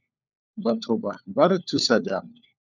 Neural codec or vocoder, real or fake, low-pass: codec, 16 kHz, 4 kbps, FunCodec, trained on LibriTTS, 50 frames a second; fake; 7.2 kHz